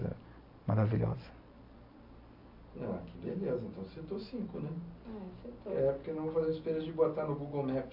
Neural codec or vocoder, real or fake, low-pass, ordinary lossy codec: none; real; 5.4 kHz; MP3, 32 kbps